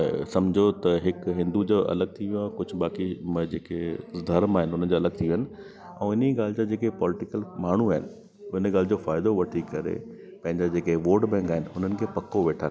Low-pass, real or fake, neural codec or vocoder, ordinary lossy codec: none; real; none; none